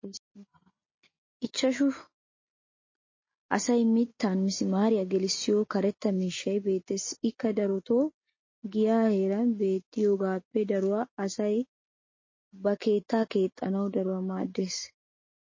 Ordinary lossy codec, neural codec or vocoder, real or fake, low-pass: MP3, 32 kbps; none; real; 7.2 kHz